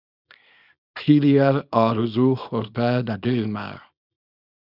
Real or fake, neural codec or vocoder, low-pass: fake; codec, 24 kHz, 0.9 kbps, WavTokenizer, small release; 5.4 kHz